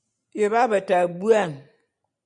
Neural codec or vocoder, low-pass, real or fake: none; 9.9 kHz; real